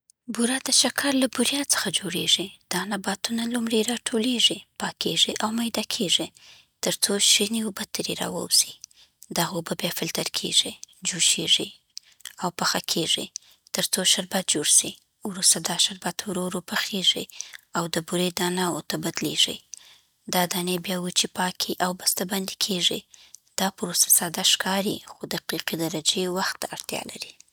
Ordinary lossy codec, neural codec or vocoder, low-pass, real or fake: none; none; none; real